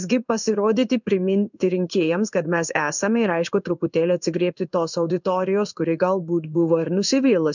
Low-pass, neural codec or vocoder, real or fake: 7.2 kHz; codec, 16 kHz in and 24 kHz out, 1 kbps, XY-Tokenizer; fake